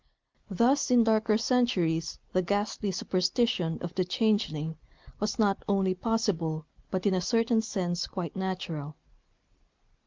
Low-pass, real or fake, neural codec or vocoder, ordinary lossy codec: 7.2 kHz; real; none; Opus, 24 kbps